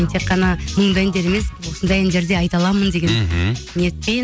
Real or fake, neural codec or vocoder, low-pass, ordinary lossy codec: real; none; none; none